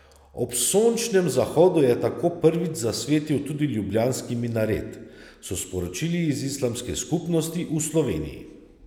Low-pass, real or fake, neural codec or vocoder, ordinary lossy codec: 19.8 kHz; real; none; none